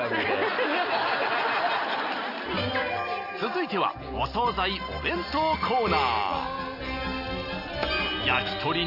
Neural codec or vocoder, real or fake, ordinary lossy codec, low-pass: none; real; none; 5.4 kHz